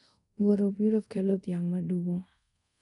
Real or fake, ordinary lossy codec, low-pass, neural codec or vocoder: fake; none; 10.8 kHz; codec, 24 kHz, 0.5 kbps, DualCodec